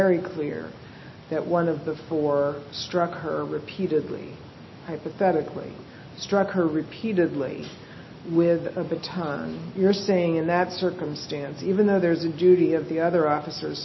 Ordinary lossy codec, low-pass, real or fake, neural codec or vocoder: MP3, 24 kbps; 7.2 kHz; real; none